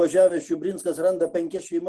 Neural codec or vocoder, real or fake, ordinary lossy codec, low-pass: none; real; Opus, 16 kbps; 10.8 kHz